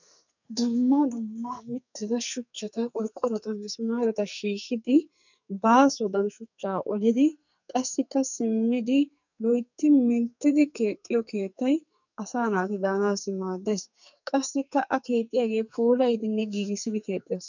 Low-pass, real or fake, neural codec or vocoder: 7.2 kHz; fake; codec, 44.1 kHz, 2.6 kbps, SNAC